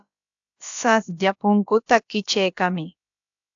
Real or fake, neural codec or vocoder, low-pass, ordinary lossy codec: fake; codec, 16 kHz, about 1 kbps, DyCAST, with the encoder's durations; 7.2 kHz; AAC, 64 kbps